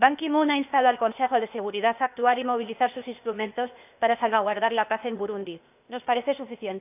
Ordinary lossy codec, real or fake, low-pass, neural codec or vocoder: none; fake; 3.6 kHz; codec, 16 kHz, 0.8 kbps, ZipCodec